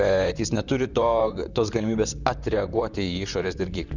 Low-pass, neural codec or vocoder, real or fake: 7.2 kHz; vocoder, 44.1 kHz, 128 mel bands, Pupu-Vocoder; fake